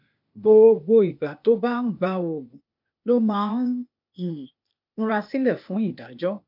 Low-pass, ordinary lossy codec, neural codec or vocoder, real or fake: 5.4 kHz; MP3, 48 kbps; codec, 16 kHz, 0.8 kbps, ZipCodec; fake